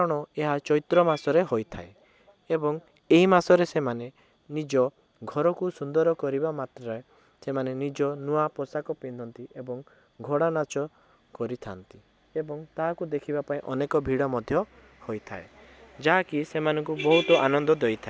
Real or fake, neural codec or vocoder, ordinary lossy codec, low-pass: real; none; none; none